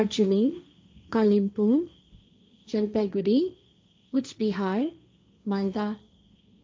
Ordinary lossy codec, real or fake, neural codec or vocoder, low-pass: none; fake; codec, 16 kHz, 1.1 kbps, Voila-Tokenizer; none